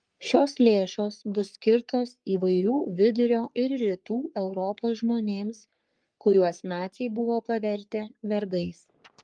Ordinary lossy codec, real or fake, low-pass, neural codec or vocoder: Opus, 32 kbps; fake; 9.9 kHz; codec, 44.1 kHz, 3.4 kbps, Pupu-Codec